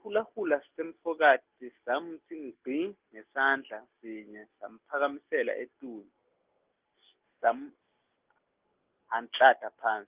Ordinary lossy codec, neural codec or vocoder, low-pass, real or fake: Opus, 32 kbps; none; 3.6 kHz; real